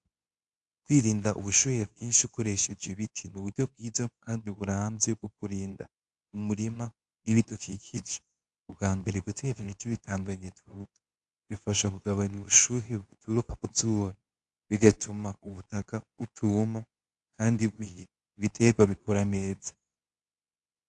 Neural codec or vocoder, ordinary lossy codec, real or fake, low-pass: codec, 24 kHz, 0.9 kbps, WavTokenizer, medium speech release version 2; AAC, 64 kbps; fake; 10.8 kHz